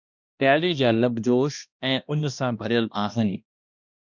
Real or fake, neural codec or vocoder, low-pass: fake; codec, 16 kHz, 1 kbps, X-Codec, HuBERT features, trained on balanced general audio; 7.2 kHz